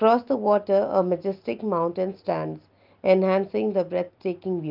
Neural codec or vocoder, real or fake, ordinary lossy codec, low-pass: none; real; Opus, 32 kbps; 5.4 kHz